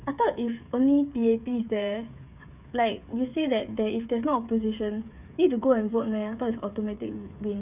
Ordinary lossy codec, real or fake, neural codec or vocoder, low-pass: none; fake; codec, 16 kHz, 8 kbps, FreqCodec, smaller model; 3.6 kHz